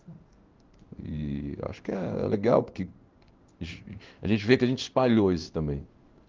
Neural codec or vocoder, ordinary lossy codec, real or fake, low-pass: codec, 16 kHz in and 24 kHz out, 1 kbps, XY-Tokenizer; Opus, 24 kbps; fake; 7.2 kHz